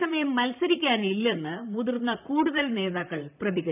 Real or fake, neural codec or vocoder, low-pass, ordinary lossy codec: fake; vocoder, 44.1 kHz, 128 mel bands, Pupu-Vocoder; 3.6 kHz; none